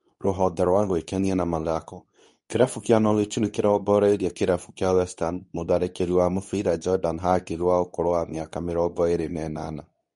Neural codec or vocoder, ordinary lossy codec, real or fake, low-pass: codec, 24 kHz, 0.9 kbps, WavTokenizer, medium speech release version 2; MP3, 48 kbps; fake; 10.8 kHz